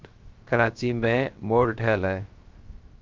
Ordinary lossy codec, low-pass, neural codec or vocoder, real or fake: Opus, 24 kbps; 7.2 kHz; codec, 16 kHz, 0.3 kbps, FocalCodec; fake